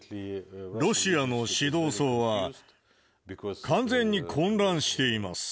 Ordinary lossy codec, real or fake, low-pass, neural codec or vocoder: none; real; none; none